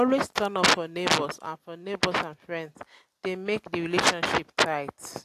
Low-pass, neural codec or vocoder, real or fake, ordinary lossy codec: 14.4 kHz; none; real; AAC, 64 kbps